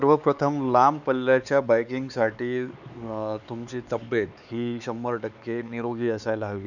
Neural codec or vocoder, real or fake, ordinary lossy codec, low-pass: codec, 16 kHz, 4 kbps, X-Codec, HuBERT features, trained on LibriSpeech; fake; none; 7.2 kHz